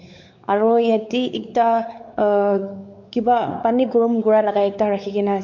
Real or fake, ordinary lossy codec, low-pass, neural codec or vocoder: fake; MP3, 64 kbps; 7.2 kHz; codec, 16 kHz, 4 kbps, X-Codec, WavLM features, trained on Multilingual LibriSpeech